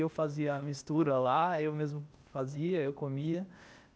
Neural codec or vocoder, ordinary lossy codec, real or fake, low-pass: codec, 16 kHz, 0.8 kbps, ZipCodec; none; fake; none